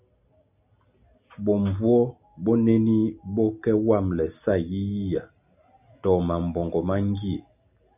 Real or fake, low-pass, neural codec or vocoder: real; 3.6 kHz; none